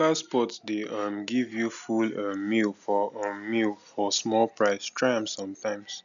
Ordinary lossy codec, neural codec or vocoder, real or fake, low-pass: none; none; real; 7.2 kHz